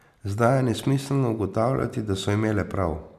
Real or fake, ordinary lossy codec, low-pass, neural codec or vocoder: real; MP3, 96 kbps; 14.4 kHz; none